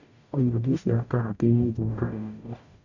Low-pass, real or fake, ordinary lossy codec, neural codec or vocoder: 7.2 kHz; fake; none; codec, 44.1 kHz, 0.9 kbps, DAC